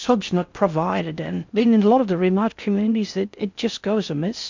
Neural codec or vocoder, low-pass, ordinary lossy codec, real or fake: codec, 16 kHz in and 24 kHz out, 0.6 kbps, FocalCodec, streaming, 4096 codes; 7.2 kHz; MP3, 64 kbps; fake